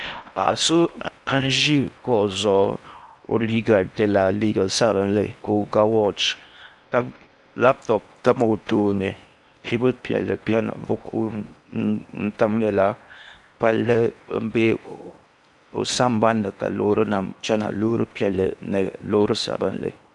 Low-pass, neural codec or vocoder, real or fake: 10.8 kHz; codec, 16 kHz in and 24 kHz out, 0.8 kbps, FocalCodec, streaming, 65536 codes; fake